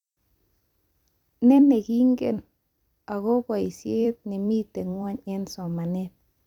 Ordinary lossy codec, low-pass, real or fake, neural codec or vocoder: none; 19.8 kHz; fake; vocoder, 44.1 kHz, 128 mel bands every 256 samples, BigVGAN v2